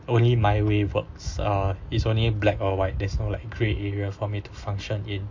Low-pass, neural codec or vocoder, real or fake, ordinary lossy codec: 7.2 kHz; none; real; MP3, 48 kbps